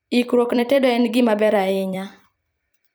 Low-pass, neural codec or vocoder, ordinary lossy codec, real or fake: none; vocoder, 44.1 kHz, 128 mel bands every 256 samples, BigVGAN v2; none; fake